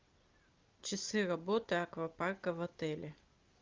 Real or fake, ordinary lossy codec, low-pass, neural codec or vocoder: real; Opus, 32 kbps; 7.2 kHz; none